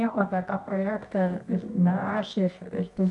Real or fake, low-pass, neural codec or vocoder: fake; 10.8 kHz; codec, 24 kHz, 0.9 kbps, WavTokenizer, medium music audio release